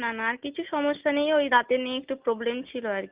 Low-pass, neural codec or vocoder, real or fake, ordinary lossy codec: 3.6 kHz; none; real; Opus, 24 kbps